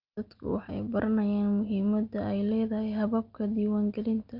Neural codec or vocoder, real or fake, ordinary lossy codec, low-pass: none; real; Opus, 32 kbps; 5.4 kHz